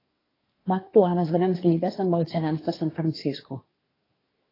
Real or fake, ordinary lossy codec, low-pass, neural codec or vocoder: fake; AAC, 24 kbps; 5.4 kHz; codec, 24 kHz, 1 kbps, SNAC